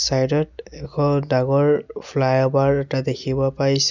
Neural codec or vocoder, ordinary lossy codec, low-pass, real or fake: none; none; 7.2 kHz; real